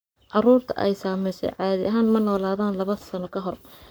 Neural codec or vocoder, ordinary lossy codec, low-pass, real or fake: codec, 44.1 kHz, 7.8 kbps, Pupu-Codec; none; none; fake